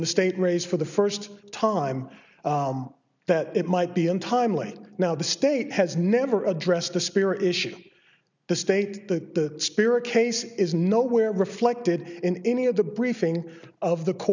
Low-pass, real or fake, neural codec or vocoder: 7.2 kHz; real; none